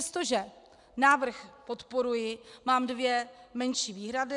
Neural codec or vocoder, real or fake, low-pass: none; real; 10.8 kHz